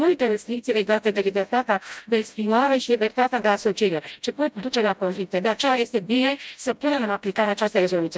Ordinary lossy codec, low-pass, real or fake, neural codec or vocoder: none; none; fake; codec, 16 kHz, 0.5 kbps, FreqCodec, smaller model